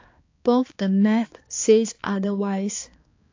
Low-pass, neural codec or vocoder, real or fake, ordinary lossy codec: 7.2 kHz; codec, 16 kHz, 2 kbps, X-Codec, HuBERT features, trained on balanced general audio; fake; AAC, 48 kbps